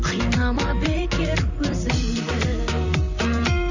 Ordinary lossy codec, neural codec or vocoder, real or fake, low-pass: none; vocoder, 44.1 kHz, 128 mel bands, Pupu-Vocoder; fake; 7.2 kHz